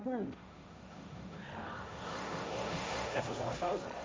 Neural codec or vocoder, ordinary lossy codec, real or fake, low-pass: codec, 16 kHz, 1.1 kbps, Voila-Tokenizer; none; fake; none